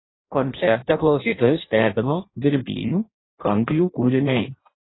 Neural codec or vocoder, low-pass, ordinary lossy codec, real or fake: codec, 16 kHz in and 24 kHz out, 0.6 kbps, FireRedTTS-2 codec; 7.2 kHz; AAC, 16 kbps; fake